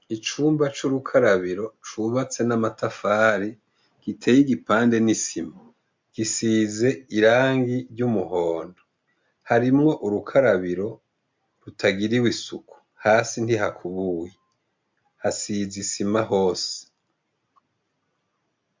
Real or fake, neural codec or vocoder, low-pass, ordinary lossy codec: real; none; 7.2 kHz; MP3, 64 kbps